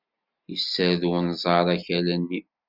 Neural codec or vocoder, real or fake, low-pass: none; real; 5.4 kHz